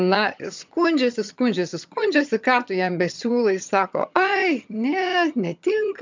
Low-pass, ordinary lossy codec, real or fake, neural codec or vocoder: 7.2 kHz; MP3, 64 kbps; fake; vocoder, 22.05 kHz, 80 mel bands, HiFi-GAN